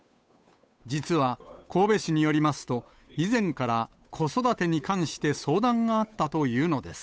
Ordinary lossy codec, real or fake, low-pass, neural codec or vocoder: none; fake; none; codec, 16 kHz, 8 kbps, FunCodec, trained on Chinese and English, 25 frames a second